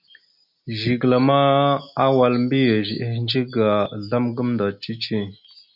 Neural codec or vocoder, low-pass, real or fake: none; 5.4 kHz; real